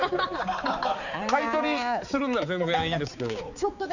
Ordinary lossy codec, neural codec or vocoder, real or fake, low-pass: none; codec, 16 kHz, 4 kbps, X-Codec, HuBERT features, trained on balanced general audio; fake; 7.2 kHz